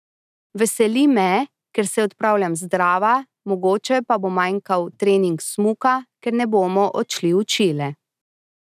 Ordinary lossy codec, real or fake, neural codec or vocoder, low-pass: none; real; none; 14.4 kHz